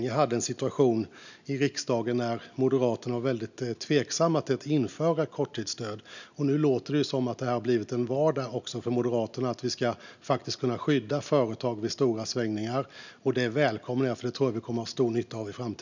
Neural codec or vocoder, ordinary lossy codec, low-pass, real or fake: none; none; 7.2 kHz; real